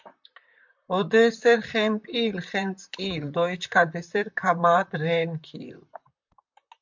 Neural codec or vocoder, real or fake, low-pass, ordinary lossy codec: vocoder, 44.1 kHz, 128 mel bands, Pupu-Vocoder; fake; 7.2 kHz; MP3, 64 kbps